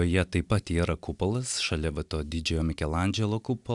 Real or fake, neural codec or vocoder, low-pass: real; none; 10.8 kHz